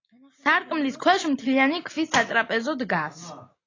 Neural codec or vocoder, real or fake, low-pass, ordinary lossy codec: none; real; 7.2 kHz; AAC, 32 kbps